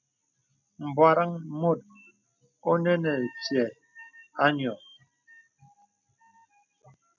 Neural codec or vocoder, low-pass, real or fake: none; 7.2 kHz; real